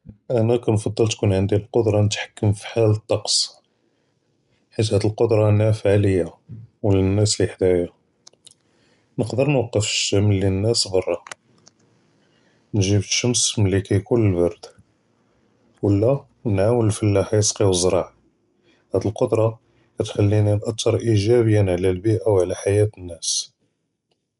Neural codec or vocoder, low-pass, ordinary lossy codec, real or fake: none; 10.8 kHz; none; real